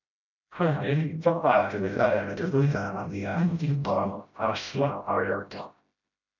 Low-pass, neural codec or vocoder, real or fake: 7.2 kHz; codec, 16 kHz, 0.5 kbps, FreqCodec, smaller model; fake